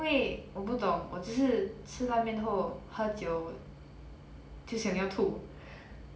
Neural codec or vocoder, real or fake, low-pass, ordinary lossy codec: none; real; none; none